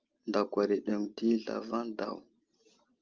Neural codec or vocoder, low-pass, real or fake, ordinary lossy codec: none; 7.2 kHz; real; Opus, 32 kbps